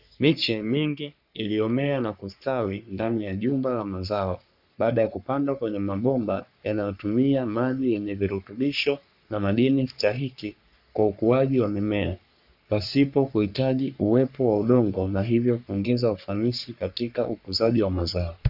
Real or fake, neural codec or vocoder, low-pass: fake; codec, 44.1 kHz, 3.4 kbps, Pupu-Codec; 5.4 kHz